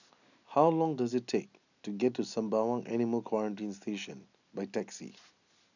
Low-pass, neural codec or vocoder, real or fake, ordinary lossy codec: 7.2 kHz; none; real; none